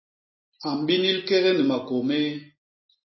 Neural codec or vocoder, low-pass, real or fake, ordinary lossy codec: none; 7.2 kHz; real; MP3, 24 kbps